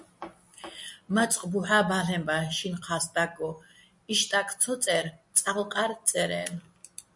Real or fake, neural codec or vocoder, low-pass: real; none; 10.8 kHz